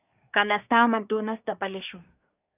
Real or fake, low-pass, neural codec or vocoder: fake; 3.6 kHz; codec, 24 kHz, 1 kbps, SNAC